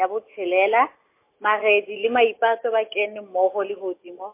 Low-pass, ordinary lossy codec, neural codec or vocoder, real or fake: 3.6 kHz; MP3, 24 kbps; none; real